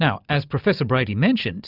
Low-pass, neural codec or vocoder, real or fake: 5.4 kHz; none; real